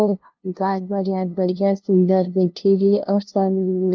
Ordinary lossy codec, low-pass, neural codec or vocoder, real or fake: Opus, 24 kbps; 7.2 kHz; codec, 16 kHz, 0.5 kbps, FunCodec, trained on LibriTTS, 25 frames a second; fake